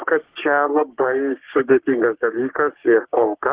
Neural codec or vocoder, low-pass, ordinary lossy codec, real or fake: codec, 44.1 kHz, 3.4 kbps, Pupu-Codec; 3.6 kHz; Opus, 16 kbps; fake